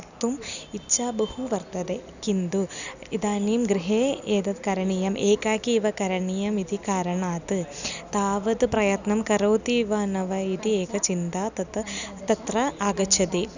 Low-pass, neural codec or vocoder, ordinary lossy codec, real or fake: 7.2 kHz; none; none; real